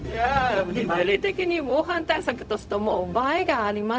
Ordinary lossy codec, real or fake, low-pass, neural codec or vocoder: none; fake; none; codec, 16 kHz, 0.4 kbps, LongCat-Audio-Codec